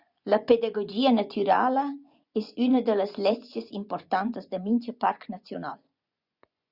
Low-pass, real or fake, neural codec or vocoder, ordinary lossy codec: 5.4 kHz; fake; vocoder, 24 kHz, 100 mel bands, Vocos; Opus, 64 kbps